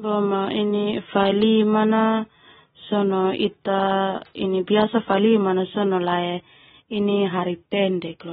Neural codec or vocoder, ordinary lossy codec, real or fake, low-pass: none; AAC, 16 kbps; real; 19.8 kHz